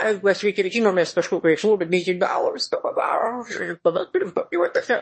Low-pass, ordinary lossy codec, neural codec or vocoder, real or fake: 9.9 kHz; MP3, 32 kbps; autoencoder, 22.05 kHz, a latent of 192 numbers a frame, VITS, trained on one speaker; fake